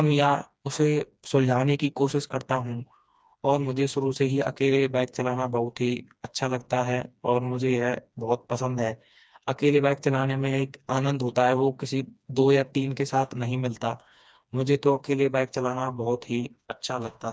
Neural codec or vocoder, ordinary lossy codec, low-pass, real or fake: codec, 16 kHz, 2 kbps, FreqCodec, smaller model; none; none; fake